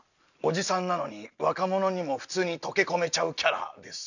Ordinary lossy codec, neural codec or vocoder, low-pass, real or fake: none; none; 7.2 kHz; real